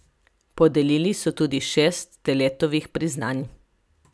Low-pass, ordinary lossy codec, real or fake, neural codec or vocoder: none; none; real; none